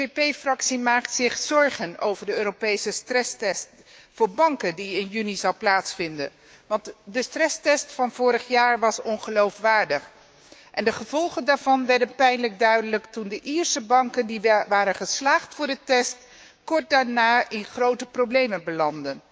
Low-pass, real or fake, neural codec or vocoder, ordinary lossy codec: none; fake; codec, 16 kHz, 6 kbps, DAC; none